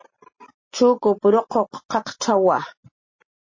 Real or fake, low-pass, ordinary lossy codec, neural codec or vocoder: real; 7.2 kHz; MP3, 32 kbps; none